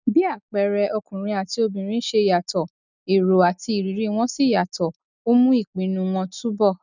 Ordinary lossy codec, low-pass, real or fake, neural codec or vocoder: none; 7.2 kHz; real; none